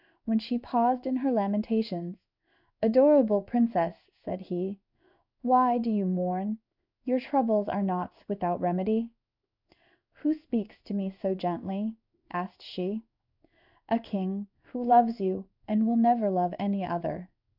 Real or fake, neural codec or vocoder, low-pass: fake; codec, 16 kHz in and 24 kHz out, 1 kbps, XY-Tokenizer; 5.4 kHz